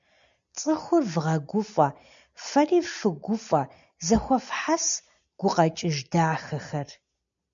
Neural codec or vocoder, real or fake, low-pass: none; real; 7.2 kHz